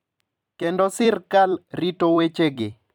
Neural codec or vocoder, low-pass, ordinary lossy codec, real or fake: vocoder, 44.1 kHz, 128 mel bands every 256 samples, BigVGAN v2; 19.8 kHz; none; fake